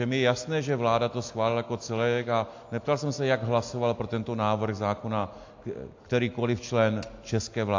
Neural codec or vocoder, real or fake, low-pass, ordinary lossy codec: none; real; 7.2 kHz; AAC, 48 kbps